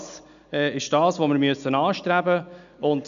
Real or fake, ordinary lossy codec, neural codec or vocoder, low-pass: real; none; none; 7.2 kHz